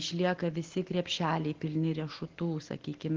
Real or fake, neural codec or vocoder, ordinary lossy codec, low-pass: real; none; Opus, 16 kbps; 7.2 kHz